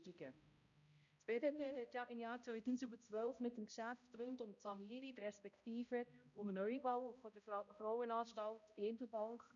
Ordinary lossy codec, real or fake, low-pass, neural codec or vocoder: none; fake; 7.2 kHz; codec, 16 kHz, 0.5 kbps, X-Codec, HuBERT features, trained on balanced general audio